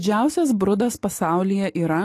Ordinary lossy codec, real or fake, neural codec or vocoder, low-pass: AAC, 48 kbps; real; none; 14.4 kHz